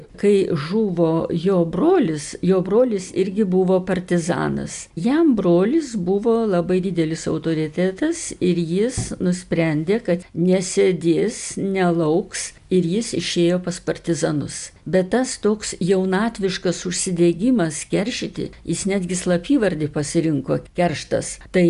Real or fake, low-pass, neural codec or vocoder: real; 10.8 kHz; none